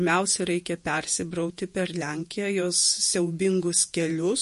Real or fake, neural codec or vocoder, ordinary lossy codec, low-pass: fake; vocoder, 48 kHz, 128 mel bands, Vocos; MP3, 48 kbps; 14.4 kHz